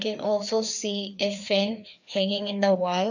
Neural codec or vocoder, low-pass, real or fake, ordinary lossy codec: codec, 16 kHz, 2 kbps, FreqCodec, larger model; 7.2 kHz; fake; none